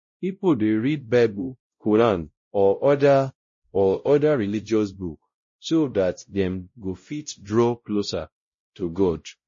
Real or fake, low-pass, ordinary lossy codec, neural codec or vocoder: fake; 7.2 kHz; MP3, 32 kbps; codec, 16 kHz, 0.5 kbps, X-Codec, WavLM features, trained on Multilingual LibriSpeech